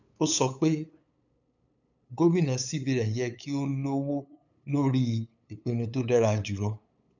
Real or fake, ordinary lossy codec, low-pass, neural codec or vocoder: fake; none; 7.2 kHz; codec, 16 kHz, 8 kbps, FunCodec, trained on LibriTTS, 25 frames a second